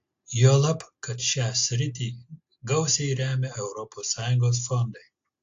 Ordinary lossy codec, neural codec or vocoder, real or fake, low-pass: AAC, 48 kbps; none; real; 7.2 kHz